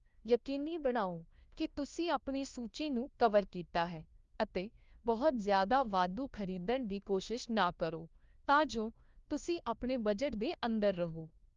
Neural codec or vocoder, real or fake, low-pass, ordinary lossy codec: codec, 16 kHz, 1 kbps, FunCodec, trained on LibriTTS, 50 frames a second; fake; 7.2 kHz; Opus, 32 kbps